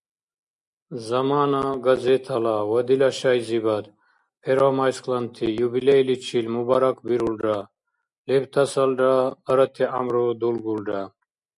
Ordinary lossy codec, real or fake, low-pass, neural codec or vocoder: MP3, 96 kbps; real; 10.8 kHz; none